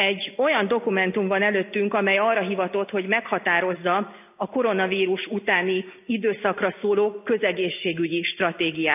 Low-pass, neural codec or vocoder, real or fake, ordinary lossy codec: 3.6 kHz; none; real; none